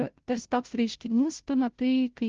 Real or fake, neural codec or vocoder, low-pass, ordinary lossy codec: fake; codec, 16 kHz, 0.5 kbps, FunCodec, trained on Chinese and English, 25 frames a second; 7.2 kHz; Opus, 16 kbps